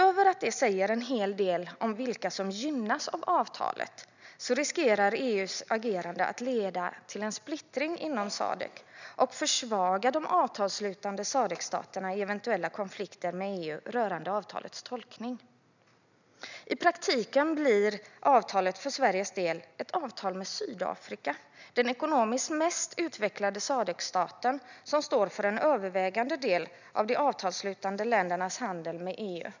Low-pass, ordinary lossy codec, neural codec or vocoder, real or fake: 7.2 kHz; none; none; real